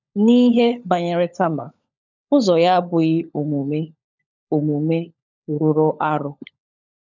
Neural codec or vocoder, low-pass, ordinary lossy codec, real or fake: codec, 16 kHz, 16 kbps, FunCodec, trained on LibriTTS, 50 frames a second; 7.2 kHz; none; fake